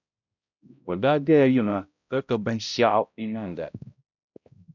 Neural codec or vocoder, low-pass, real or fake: codec, 16 kHz, 0.5 kbps, X-Codec, HuBERT features, trained on balanced general audio; 7.2 kHz; fake